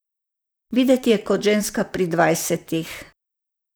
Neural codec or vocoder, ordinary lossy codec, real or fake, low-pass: vocoder, 44.1 kHz, 128 mel bands, Pupu-Vocoder; none; fake; none